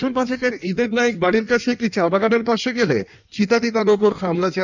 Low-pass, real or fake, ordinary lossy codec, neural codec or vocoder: 7.2 kHz; fake; none; codec, 16 kHz in and 24 kHz out, 1.1 kbps, FireRedTTS-2 codec